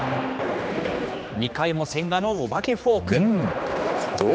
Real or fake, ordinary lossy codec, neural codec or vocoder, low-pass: fake; none; codec, 16 kHz, 2 kbps, X-Codec, HuBERT features, trained on general audio; none